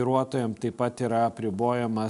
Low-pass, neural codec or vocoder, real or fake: 10.8 kHz; none; real